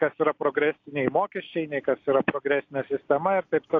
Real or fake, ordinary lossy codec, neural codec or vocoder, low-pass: real; AAC, 48 kbps; none; 7.2 kHz